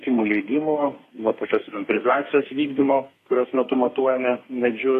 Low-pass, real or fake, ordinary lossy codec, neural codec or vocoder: 14.4 kHz; fake; AAC, 48 kbps; codec, 32 kHz, 1.9 kbps, SNAC